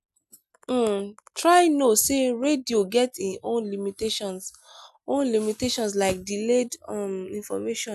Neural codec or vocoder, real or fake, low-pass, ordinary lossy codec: none; real; 14.4 kHz; none